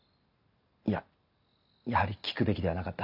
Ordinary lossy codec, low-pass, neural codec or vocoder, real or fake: none; 5.4 kHz; none; real